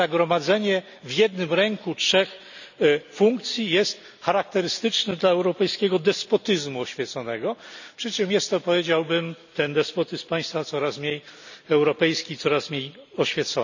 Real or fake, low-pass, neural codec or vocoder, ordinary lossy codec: real; 7.2 kHz; none; none